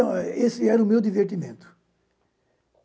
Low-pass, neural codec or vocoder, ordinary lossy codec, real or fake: none; none; none; real